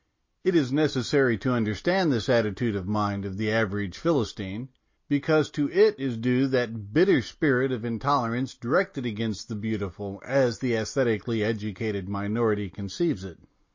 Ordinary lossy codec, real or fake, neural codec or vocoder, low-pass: MP3, 32 kbps; real; none; 7.2 kHz